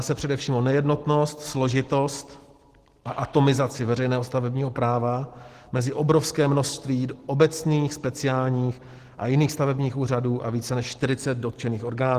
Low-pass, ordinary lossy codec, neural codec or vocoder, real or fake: 14.4 kHz; Opus, 16 kbps; none; real